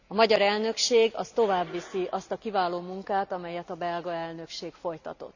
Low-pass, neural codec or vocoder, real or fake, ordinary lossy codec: 7.2 kHz; none; real; none